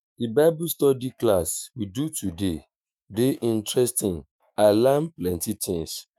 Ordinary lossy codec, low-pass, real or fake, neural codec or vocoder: none; none; fake; autoencoder, 48 kHz, 128 numbers a frame, DAC-VAE, trained on Japanese speech